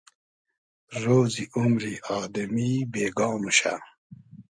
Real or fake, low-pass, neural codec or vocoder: real; 9.9 kHz; none